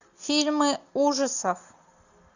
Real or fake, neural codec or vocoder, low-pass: real; none; 7.2 kHz